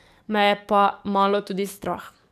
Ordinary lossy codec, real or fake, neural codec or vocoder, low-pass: none; fake; codec, 44.1 kHz, 7.8 kbps, DAC; 14.4 kHz